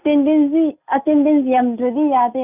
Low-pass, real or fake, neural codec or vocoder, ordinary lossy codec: 3.6 kHz; real; none; none